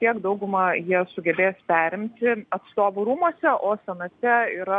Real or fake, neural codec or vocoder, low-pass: real; none; 9.9 kHz